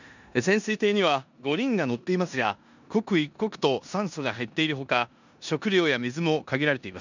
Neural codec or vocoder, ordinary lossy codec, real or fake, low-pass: codec, 16 kHz in and 24 kHz out, 0.9 kbps, LongCat-Audio-Codec, four codebook decoder; none; fake; 7.2 kHz